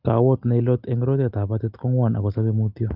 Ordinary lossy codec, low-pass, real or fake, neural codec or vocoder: Opus, 32 kbps; 5.4 kHz; real; none